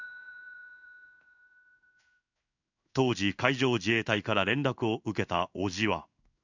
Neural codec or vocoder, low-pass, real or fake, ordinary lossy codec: codec, 16 kHz in and 24 kHz out, 1 kbps, XY-Tokenizer; 7.2 kHz; fake; none